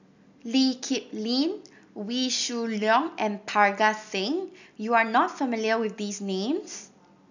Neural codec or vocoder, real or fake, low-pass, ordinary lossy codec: none; real; 7.2 kHz; none